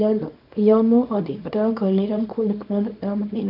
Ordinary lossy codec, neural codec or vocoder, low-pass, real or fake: none; codec, 24 kHz, 0.9 kbps, WavTokenizer, small release; 5.4 kHz; fake